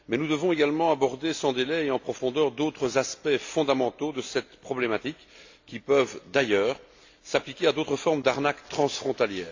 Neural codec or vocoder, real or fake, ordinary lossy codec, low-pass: none; real; AAC, 48 kbps; 7.2 kHz